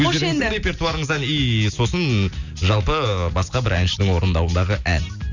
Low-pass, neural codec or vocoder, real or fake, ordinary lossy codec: 7.2 kHz; none; real; none